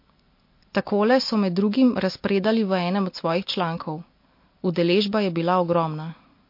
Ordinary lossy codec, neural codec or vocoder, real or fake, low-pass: MP3, 32 kbps; none; real; 5.4 kHz